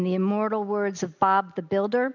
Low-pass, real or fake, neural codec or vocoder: 7.2 kHz; real; none